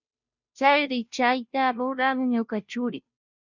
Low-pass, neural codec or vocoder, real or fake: 7.2 kHz; codec, 16 kHz, 0.5 kbps, FunCodec, trained on Chinese and English, 25 frames a second; fake